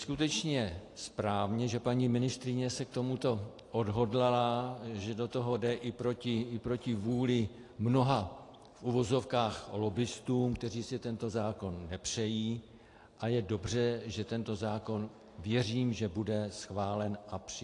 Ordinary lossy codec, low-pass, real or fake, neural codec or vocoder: AAC, 48 kbps; 10.8 kHz; real; none